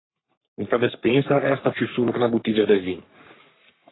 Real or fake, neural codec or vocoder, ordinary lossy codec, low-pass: fake; codec, 44.1 kHz, 3.4 kbps, Pupu-Codec; AAC, 16 kbps; 7.2 kHz